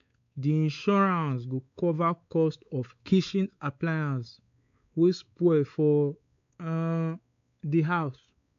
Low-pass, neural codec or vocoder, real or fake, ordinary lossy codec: 7.2 kHz; codec, 16 kHz, 4 kbps, X-Codec, WavLM features, trained on Multilingual LibriSpeech; fake; MP3, 64 kbps